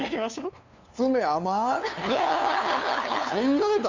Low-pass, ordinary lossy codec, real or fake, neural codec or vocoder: 7.2 kHz; Opus, 64 kbps; fake; codec, 16 kHz, 2 kbps, FunCodec, trained on LibriTTS, 25 frames a second